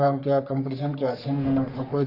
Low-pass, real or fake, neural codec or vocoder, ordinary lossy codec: 5.4 kHz; fake; codec, 44.1 kHz, 3.4 kbps, Pupu-Codec; none